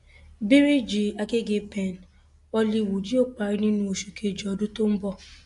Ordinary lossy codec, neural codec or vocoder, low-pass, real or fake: AAC, 64 kbps; none; 10.8 kHz; real